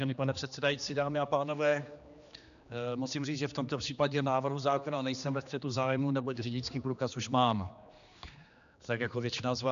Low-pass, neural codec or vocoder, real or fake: 7.2 kHz; codec, 16 kHz, 2 kbps, X-Codec, HuBERT features, trained on general audio; fake